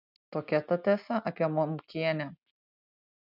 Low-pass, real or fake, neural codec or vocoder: 5.4 kHz; real; none